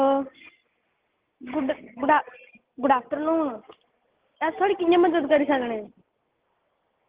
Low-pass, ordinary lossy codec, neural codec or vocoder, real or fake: 3.6 kHz; Opus, 16 kbps; none; real